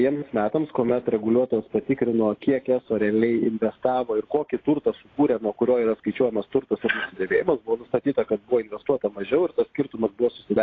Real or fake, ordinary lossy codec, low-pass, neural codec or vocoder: real; AAC, 32 kbps; 7.2 kHz; none